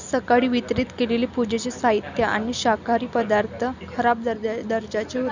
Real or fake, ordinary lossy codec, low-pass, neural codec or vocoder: real; none; 7.2 kHz; none